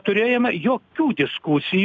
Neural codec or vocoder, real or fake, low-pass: none; real; 7.2 kHz